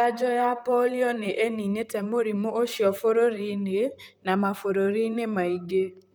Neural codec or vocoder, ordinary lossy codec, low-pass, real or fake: vocoder, 44.1 kHz, 128 mel bands, Pupu-Vocoder; none; none; fake